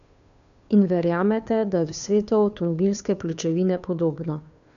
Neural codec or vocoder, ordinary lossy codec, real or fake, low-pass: codec, 16 kHz, 2 kbps, FunCodec, trained on Chinese and English, 25 frames a second; none; fake; 7.2 kHz